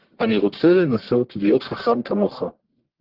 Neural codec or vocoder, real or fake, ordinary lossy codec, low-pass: codec, 44.1 kHz, 1.7 kbps, Pupu-Codec; fake; Opus, 16 kbps; 5.4 kHz